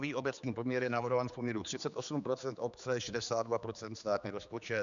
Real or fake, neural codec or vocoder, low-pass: fake; codec, 16 kHz, 4 kbps, X-Codec, HuBERT features, trained on general audio; 7.2 kHz